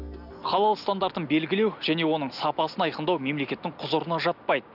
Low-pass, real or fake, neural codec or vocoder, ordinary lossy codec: 5.4 kHz; real; none; none